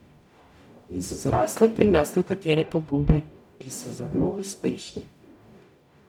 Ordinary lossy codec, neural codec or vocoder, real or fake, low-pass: none; codec, 44.1 kHz, 0.9 kbps, DAC; fake; 19.8 kHz